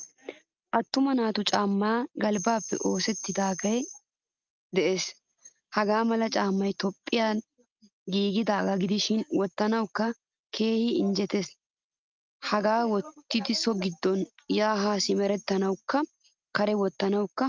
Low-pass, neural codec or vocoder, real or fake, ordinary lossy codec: 7.2 kHz; none; real; Opus, 32 kbps